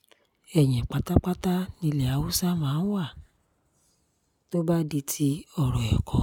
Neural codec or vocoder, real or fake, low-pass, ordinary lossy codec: none; real; 19.8 kHz; none